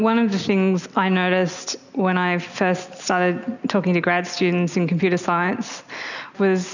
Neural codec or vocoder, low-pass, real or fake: none; 7.2 kHz; real